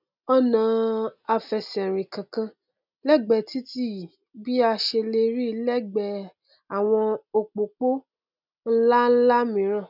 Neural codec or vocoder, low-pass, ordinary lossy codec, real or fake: none; 5.4 kHz; none; real